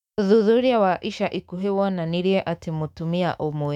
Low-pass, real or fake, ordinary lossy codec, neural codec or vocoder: 19.8 kHz; fake; none; autoencoder, 48 kHz, 128 numbers a frame, DAC-VAE, trained on Japanese speech